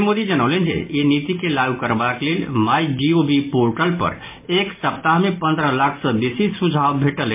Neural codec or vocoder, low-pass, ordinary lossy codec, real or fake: none; 3.6 kHz; MP3, 24 kbps; real